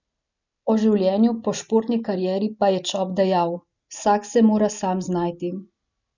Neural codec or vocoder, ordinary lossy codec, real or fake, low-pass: none; none; real; 7.2 kHz